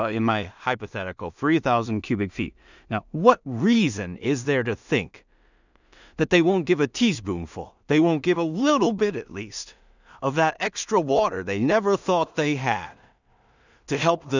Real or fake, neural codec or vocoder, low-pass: fake; codec, 16 kHz in and 24 kHz out, 0.4 kbps, LongCat-Audio-Codec, two codebook decoder; 7.2 kHz